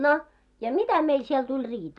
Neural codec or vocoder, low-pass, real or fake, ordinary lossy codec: none; 10.8 kHz; real; none